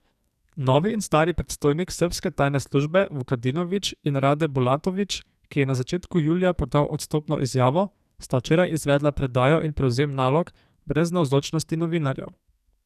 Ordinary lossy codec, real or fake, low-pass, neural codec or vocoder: none; fake; 14.4 kHz; codec, 44.1 kHz, 2.6 kbps, SNAC